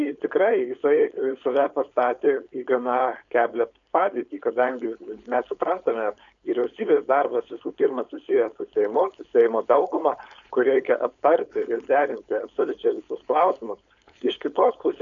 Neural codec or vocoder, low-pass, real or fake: codec, 16 kHz, 4.8 kbps, FACodec; 7.2 kHz; fake